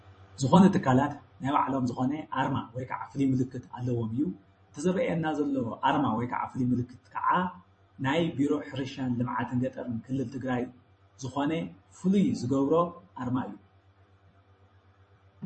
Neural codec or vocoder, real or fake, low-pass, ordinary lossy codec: vocoder, 44.1 kHz, 128 mel bands every 256 samples, BigVGAN v2; fake; 10.8 kHz; MP3, 32 kbps